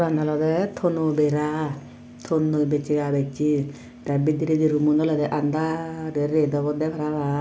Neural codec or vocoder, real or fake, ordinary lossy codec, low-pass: none; real; none; none